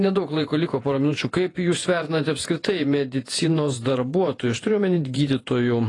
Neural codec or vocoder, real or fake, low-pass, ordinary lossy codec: vocoder, 48 kHz, 128 mel bands, Vocos; fake; 10.8 kHz; AAC, 32 kbps